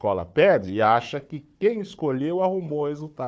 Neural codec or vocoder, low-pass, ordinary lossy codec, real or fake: codec, 16 kHz, 4 kbps, FunCodec, trained on Chinese and English, 50 frames a second; none; none; fake